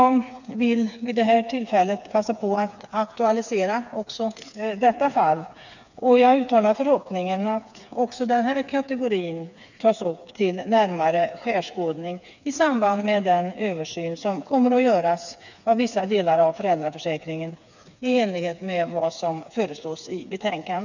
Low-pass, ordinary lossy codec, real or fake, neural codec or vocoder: 7.2 kHz; none; fake; codec, 16 kHz, 4 kbps, FreqCodec, smaller model